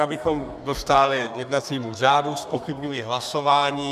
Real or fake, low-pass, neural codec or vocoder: fake; 14.4 kHz; codec, 32 kHz, 1.9 kbps, SNAC